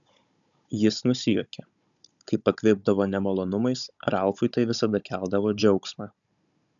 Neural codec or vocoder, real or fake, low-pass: codec, 16 kHz, 16 kbps, FunCodec, trained on Chinese and English, 50 frames a second; fake; 7.2 kHz